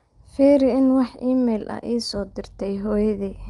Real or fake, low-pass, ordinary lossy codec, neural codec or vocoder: real; 10.8 kHz; Opus, 32 kbps; none